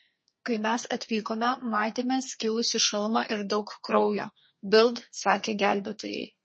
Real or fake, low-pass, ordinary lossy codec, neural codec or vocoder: fake; 9.9 kHz; MP3, 32 kbps; codec, 32 kHz, 1.9 kbps, SNAC